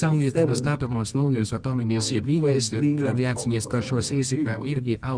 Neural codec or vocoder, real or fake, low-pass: codec, 24 kHz, 0.9 kbps, WavTokenizer, medium music audio release; fake; 9.9 kHz